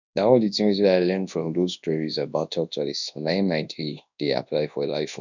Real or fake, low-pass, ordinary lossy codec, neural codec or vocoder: fake; 7.2 kHz; none; codec, 24 kHz, 0.9 kbps, WavTokenizer, large speech release